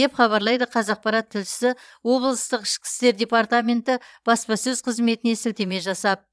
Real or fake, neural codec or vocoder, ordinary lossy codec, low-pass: fake; vocoder, 22.05 kHz, 80 mel bands, Vocos; none; none